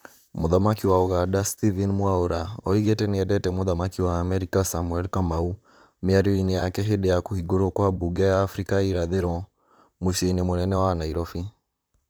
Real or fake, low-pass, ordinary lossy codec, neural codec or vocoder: fake; none; none; vocoder, 44.1 kHz, 128 mel bands, Pupu-Vocoder